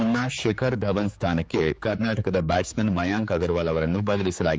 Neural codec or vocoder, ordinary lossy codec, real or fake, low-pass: codec, 16 kHz, 4 kbps, X-Codec, HuBERT features, trained on general audio; none; fake; none